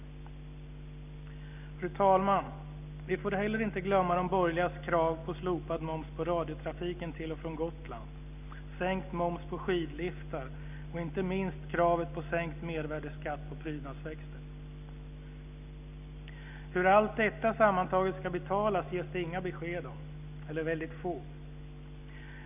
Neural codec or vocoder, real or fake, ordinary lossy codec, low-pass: none; real; none; 3.6 kHz